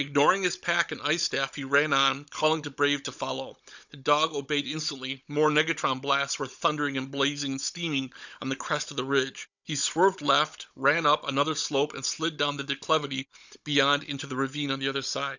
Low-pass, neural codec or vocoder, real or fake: 7.2 kHz; codec, 16 kHz, 16 kbps, FunCodec, trained on Chinese and English, 50 frames a second; fake